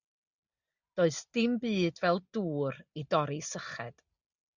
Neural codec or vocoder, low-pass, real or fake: none; 7.2 kHz; real